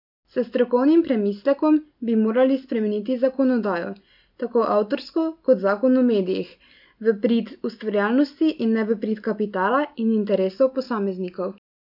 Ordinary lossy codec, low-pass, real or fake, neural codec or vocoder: none; 5.4 kHz; real; none